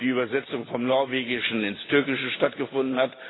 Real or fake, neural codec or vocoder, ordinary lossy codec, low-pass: real; none; AAC, 16 kbps; 7.2 kHz